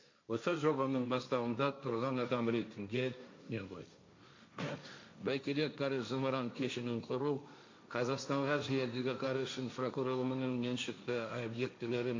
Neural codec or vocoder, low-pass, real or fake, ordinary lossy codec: codec, 16 kHz, 1.1 kbps, Voila-Tokenizer; 7.2 kHz; fake; MP3, 48 kbps